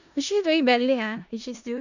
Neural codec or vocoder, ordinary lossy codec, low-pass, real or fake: codec, 16 kHz in and 24 kHz out, 0.4 kbps, LongCat-Audio-Codec, four codebook decoder; none; 7.2 kHz; fake